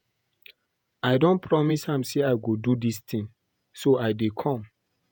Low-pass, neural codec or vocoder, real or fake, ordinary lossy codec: none; vocoder, 48 kHz, 128 mel bands, Vocos; fake; none